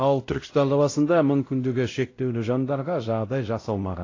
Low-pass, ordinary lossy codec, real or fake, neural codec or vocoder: 7.2 kHz; AAC, 32 kbps; fake; codec, 16 kHz, 0.5 kbps, X-Codec, WavLM features, trained on Multilingual LibriSpeech